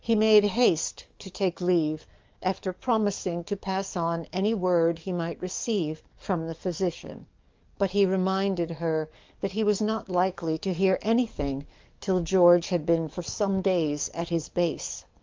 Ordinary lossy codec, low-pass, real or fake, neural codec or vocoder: Opus, 32 kbps; 7.2 kHz; fake; codec, 44.1 kHz, 7.8 kbps, DAC